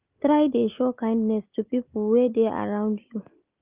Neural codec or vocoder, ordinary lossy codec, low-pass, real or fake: none; Opus, 32 kbps; 3.6 kHz; real